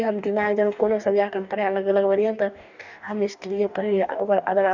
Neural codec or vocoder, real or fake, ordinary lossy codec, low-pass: codec, 44.1 kHz, 2.6 kbps, DAC; fake; none; 7.2 kHz